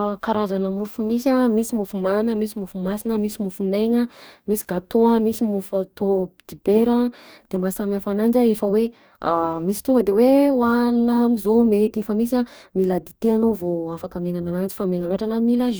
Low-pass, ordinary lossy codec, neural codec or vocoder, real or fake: none; none; codec, 44.1 kHz, 2.6 kbps, DAC; fake